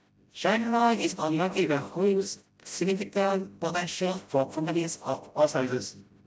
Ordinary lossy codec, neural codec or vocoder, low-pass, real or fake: none; codec, 16 kHz, 0.5 kbps, FreqCodec, smaller model; none; fake